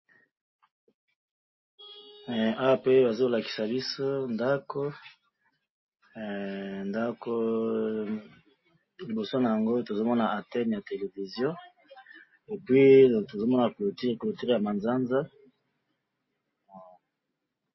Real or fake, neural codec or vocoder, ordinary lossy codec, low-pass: real; none; MP3, 24 kbps; 7.2 kHz